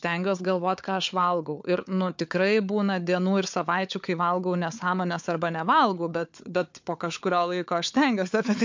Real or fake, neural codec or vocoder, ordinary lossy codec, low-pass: fake; codec, 16 kHz, 4 kbps, FunCodec, trained on Chinese and English, 50 frames a second; MP3, 64 kbps; 7.2 kHz